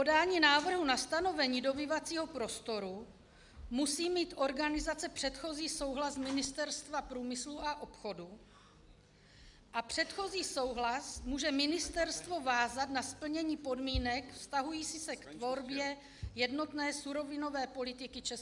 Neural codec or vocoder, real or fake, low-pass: none; real; 10.8 kHz